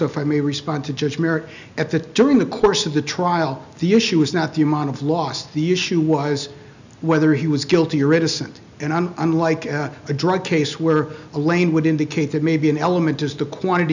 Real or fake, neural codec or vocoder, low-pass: real; none; 7.2 kHz